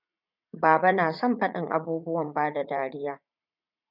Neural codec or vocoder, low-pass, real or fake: vocoder, 44.1 kHz, 80 mel bands, Vocos; 5.4 kHz; fake